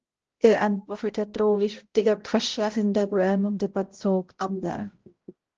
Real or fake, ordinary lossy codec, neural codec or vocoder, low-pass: fake; Opus, 16 kbps; codec, 16 kHz, 0.5 kbps, X-Codec, HuBERT features, trained on balanced general audio; 7.2 kHz